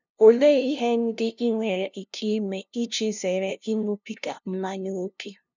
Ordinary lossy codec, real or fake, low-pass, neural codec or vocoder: none; fake; 7.2 kHz; codec, 16 kHz, 0.5 kbps, FunCodec, trained on LibriTTS, 25 frames a second